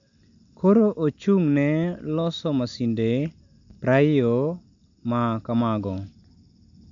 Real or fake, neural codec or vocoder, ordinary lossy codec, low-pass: real; none; MP3, 64 kbps; 7.2 kHz